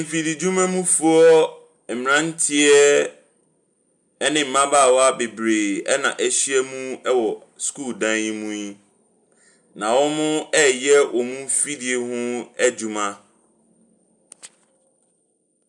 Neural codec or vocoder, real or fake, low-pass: none; real; 10.8 kHz